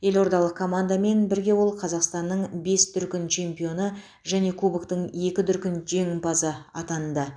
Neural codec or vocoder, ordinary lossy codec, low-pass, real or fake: none; none; 9.9 kHz; real